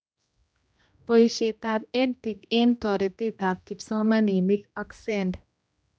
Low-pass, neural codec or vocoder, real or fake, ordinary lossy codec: none; codec, 16 kHz, 1 kbps, X-Codec, HuBERT features, trained on general audio; fake; none